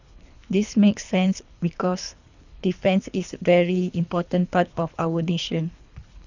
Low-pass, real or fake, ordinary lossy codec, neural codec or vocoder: 7.2 kHz; fake; none; codec, 24 kHz, 3 kbps, HILCodec